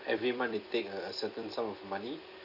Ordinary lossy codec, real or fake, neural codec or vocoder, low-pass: none; fake; vocoder, 44.1 kHz, 128 mel bands every 256 samples, BigVGAN v2; 5.4 kHz